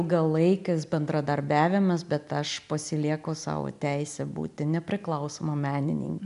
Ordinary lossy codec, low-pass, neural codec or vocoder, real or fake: MP3, 96 kbps; 10.8 kHz; none; real